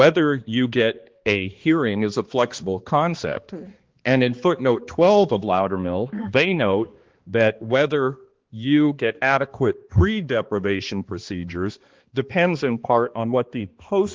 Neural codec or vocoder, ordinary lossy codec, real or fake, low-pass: codec, 16 kHz, 2 kbps, X-Codec, HuBERT features, trained on balanced general audio; Opus, 16 kbps; fake; 7.2 kHz